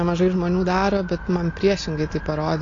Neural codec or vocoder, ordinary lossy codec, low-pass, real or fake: none; AAC, 32 kbps; 7.2 kHz; real